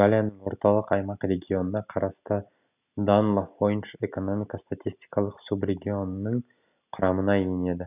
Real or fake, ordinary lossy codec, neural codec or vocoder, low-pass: real; none; none; 3.6 kHz